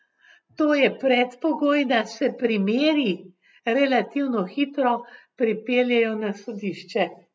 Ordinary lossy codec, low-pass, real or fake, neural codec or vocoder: none; none; real; none